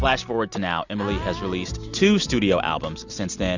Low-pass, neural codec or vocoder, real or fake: 7.2 kHz; none; real